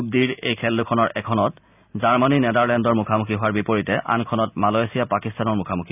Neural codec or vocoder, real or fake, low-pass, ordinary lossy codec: none; real; 3.6 kHz; none